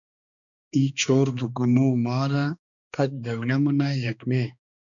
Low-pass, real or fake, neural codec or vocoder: 7.2 kHz; fake; codec, 16 kHz, 2 kbps, X-Codec, HuBERT features, trained on balanced general audio